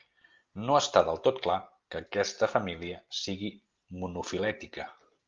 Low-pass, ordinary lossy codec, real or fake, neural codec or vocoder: 7.2 kHz; Opus, 32 kbps; real; none